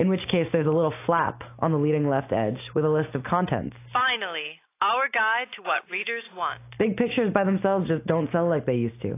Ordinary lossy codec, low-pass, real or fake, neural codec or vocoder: AAC, 24 kbps; 3.6 kHz; real; none